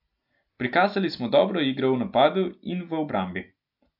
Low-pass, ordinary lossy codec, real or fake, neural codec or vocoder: 5.4 kHz; AAC, 48 kbps; real; none